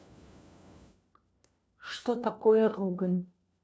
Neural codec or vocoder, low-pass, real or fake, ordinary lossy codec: codec, 16 kHz, 1 kbps, FunCodec, trained on LibriTTS, 50 frames a second; none; fake; none